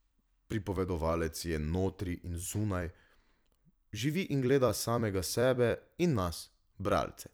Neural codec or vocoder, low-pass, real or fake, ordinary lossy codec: vocoder, 44.1 kHz, 128 mel bands every 256 samples, BigVGAN v2; none; fake; none